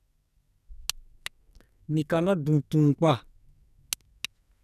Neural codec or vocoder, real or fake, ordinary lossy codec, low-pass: codec, 44.1 kHz, 2.6 kbps, SNAC; fake; none; 14.4 kHz